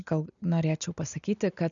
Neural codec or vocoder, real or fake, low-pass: none; real; 7.2 kHz